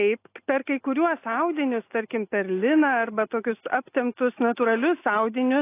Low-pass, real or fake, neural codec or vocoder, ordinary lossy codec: 3.6 kHz; real; none; AAC, 24 kbps